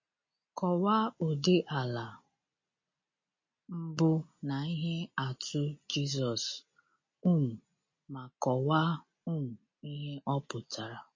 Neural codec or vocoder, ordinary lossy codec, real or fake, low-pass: none; MP3, 32 kbps; real; 7.2 kHz